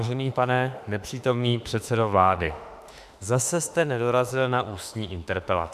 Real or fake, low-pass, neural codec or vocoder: fake; 14.4 kHz; autoencoder, 48 kHz, 32 numbers a frame, DAC-VAE, trained on Japanese speech